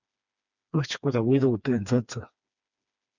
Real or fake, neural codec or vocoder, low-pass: fake; codec, 16 kHz, 2 kbps, FreqCodec, smaller model; 7.2 kHz